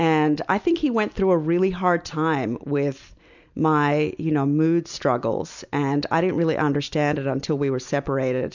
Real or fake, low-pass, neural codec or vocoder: real; 7.2 kHz; none